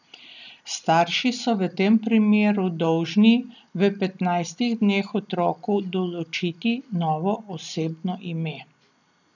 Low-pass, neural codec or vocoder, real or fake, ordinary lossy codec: 7.2 kHz; none; real; none